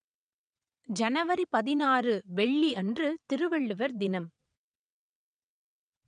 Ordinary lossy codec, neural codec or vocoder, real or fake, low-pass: none; vocoder, 22.05 kHz, 80 mel bands, WaveNeXt; fake; 9.9 kHz